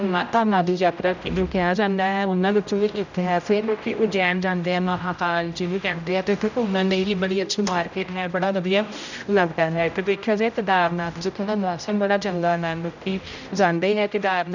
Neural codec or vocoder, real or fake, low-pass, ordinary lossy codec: codec, 16 kHz, 0.5 kbps, X-Codec, HuBERT features, trained on general audio; fake; 7.2 kHz; none